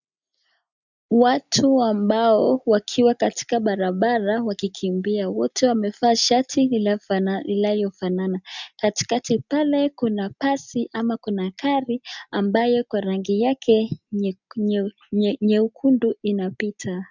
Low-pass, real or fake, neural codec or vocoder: 7.2 kHz; real; none